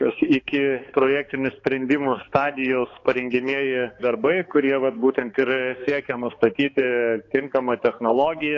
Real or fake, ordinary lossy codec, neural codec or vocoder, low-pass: fake; AAC, 32 kbps; codec, 16 kHz, 4 kbps, X-Codec, HuBERT features, trained on balanced general audio; 7.2 kHz